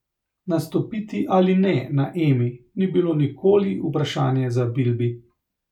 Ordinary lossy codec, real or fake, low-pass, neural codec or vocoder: none; real; 19.8 kHz; none